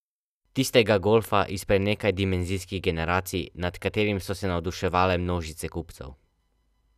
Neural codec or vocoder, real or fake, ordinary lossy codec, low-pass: none; real; none; 14.4 kHz